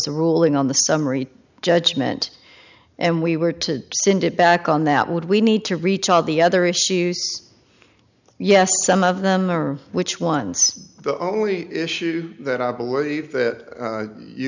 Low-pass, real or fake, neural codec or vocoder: 7.2 kHz; real; none